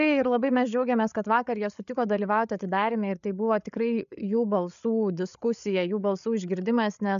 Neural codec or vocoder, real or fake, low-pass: codec, 16 kHz, 8 kbps, FreqCodec, larger model; fake; 7.2 kHz